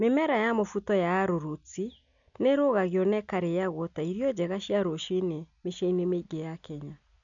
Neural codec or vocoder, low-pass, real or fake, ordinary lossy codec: none; 7.2 kHz; real; MP3, 96 kbps